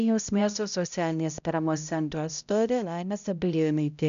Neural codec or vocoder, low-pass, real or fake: codec, 16 kHz, 0.5 kbps, X-Codec, HuBERT features, trained on balanced general audio; 7.2 kHz; fake